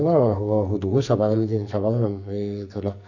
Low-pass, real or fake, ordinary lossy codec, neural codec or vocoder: 7.2 kHz; fake; none; codec, 44.1 kHz, 2.6 kbps, SNAC